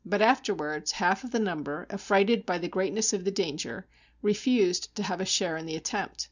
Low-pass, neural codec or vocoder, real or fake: 7.2 kHz; none; real